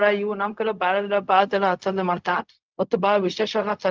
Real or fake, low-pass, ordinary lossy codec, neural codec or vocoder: fake; 7.2 kHz; Opus, 24 kbps; codec, 16 kHz, 0.4 kbps, LongCat-Audio-Codec